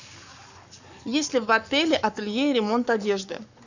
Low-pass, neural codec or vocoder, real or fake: 7.2 kHz; codec, 44.1 kHz, 7.8 kbps, Pupu-Codec; fake